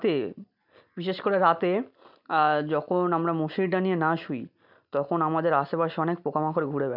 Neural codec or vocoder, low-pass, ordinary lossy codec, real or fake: none; 5.4 kHz; none; real